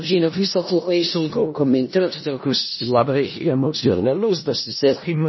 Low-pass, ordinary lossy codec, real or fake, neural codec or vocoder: 7.2 kHz; MP3, 24 kbps; fake; codec, 16 kHz in and 24 kHz out, 0.4 kbps, LongCat-Audio-Codec, four codebook decoder